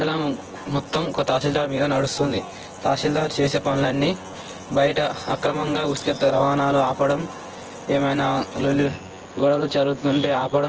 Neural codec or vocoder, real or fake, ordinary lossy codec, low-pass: vocoder, 24 kHz, 100 mel bands, Vocos; fake; Opus, 16 kbps; 7.2 kHz